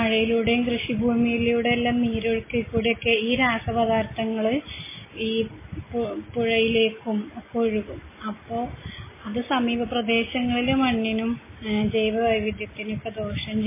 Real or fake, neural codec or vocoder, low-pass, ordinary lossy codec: real; none; 3.6 kHz; MP3, 16 kbps